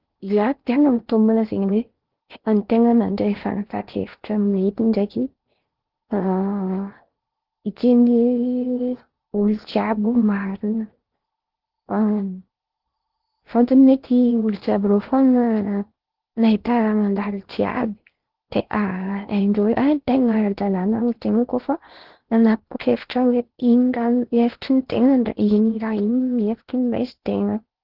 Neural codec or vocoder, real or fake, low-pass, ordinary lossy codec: codec, 16 kHz in and 24 kHz out, 0.8 kbps, FocalCodec, streaming, 65536 codes; fake; 5.4 kHz; Opus, 16 kbps